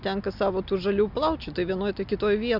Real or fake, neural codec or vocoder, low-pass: real; none; 5.4 kHz